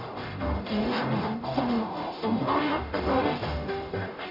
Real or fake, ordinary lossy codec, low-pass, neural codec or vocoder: fake; none; 5.4 kHz; codec, 44.1 kHz, 0.9 kbps, DAC